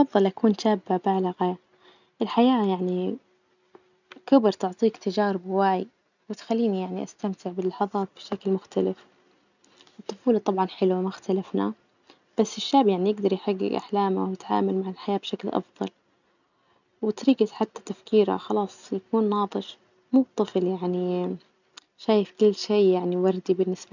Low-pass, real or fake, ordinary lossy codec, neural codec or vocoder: 7.2 kHz; real; none; none